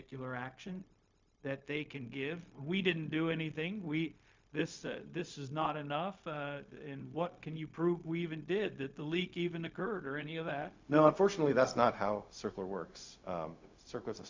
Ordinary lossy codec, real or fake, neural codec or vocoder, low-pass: MP3, 64 kbps; fake; codec, 16 kHz, 0.4 kbps, LongCat-Audio-Codec; 7.2 kHz